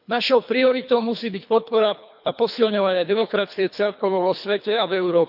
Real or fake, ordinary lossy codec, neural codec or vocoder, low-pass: fake; none; codec, 24 kHz, 3 kbps, HILCodec; 5.4 kHz